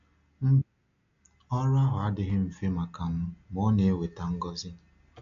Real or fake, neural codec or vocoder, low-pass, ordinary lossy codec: real; none; 7.2 kHz; AAC, 64 kbps